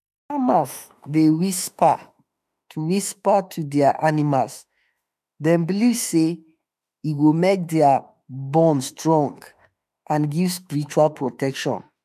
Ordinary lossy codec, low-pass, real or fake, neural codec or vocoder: none; 14.4 kHz; fake; autoencoder, 48 kHz, 32 numbers a frame, DAC-VAE, trained on Japanese speech